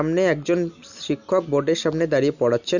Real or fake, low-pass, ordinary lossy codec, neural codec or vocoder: real; 7.2 kHz; none; none